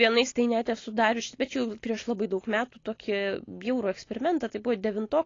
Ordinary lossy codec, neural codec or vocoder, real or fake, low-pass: AAC, 32 kbps; none; real; 7.2 kHz